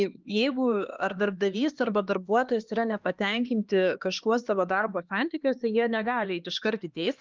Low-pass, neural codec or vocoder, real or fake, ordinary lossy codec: 7.2 kHz; codec, 16 kHz, 2 kbps, X-Codec, HuBERT features, trained on LibriSpeech; fake; Opus, 32 kbps